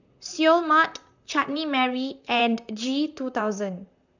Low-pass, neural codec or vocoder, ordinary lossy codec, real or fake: 7.2 kHz; vocoder, 44.1 kHz, 128 mel bands, Pupu-Vocoder; none; fake